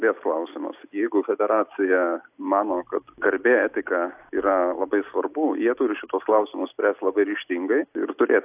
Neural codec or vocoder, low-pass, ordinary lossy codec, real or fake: none; 3.6 kHz; AAC, 32 kbps; real